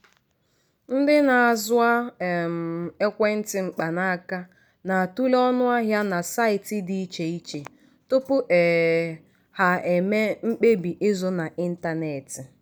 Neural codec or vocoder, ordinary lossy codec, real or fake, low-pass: none; none; real; none